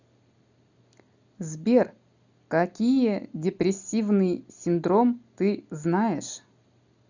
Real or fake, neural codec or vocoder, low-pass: real; none; 7.2 kHz